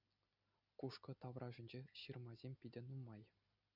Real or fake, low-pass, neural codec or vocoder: real; 5.4 kHz; none